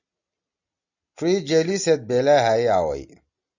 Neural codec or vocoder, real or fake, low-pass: none; real; 7.2 kHz